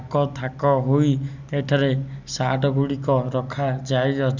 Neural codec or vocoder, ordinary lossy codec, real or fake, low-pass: none; none; real; 7.2 kHz